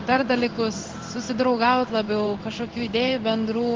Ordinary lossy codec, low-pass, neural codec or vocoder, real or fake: Opus, 16 kbps; 7.2 kHz; codec, 16 kHz in and 24 kHz out, 1 kbps, XY-Tokenizer; fake